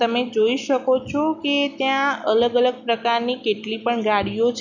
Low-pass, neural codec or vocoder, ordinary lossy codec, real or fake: 7.2 kHz; none; none; real